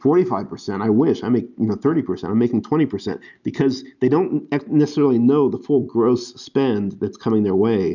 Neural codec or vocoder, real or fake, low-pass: none; real; 7.2 kHz